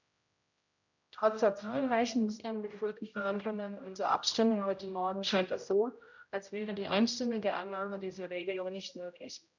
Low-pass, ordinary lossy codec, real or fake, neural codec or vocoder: 7.2 kHz; none; fake; codec, 16 kHz, 0.5 kbps, X-Codec, HuBERT features, trained on general audio